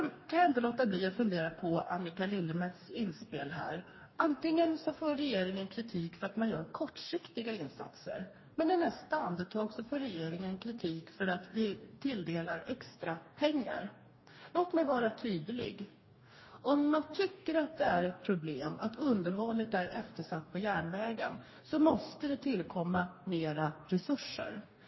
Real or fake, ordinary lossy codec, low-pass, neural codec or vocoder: fake; MP3, 24 kbps; 7.2 kHz; codec, 44.1 kHz, 2.6 kbps, DAC